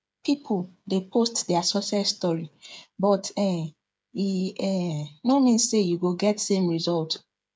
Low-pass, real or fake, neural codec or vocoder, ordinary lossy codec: none; fake; codec, 16 kHz, 8 kbps, FreqCodec, smaller model; none